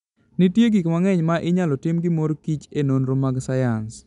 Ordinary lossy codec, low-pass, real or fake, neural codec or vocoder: MP3, 96 kbps; 10.8 kHz; real; none